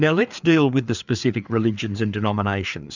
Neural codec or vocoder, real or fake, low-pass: codec, 16 kHz, 4 kbps, FreqCodec, larger model; fake; 7.2 kHz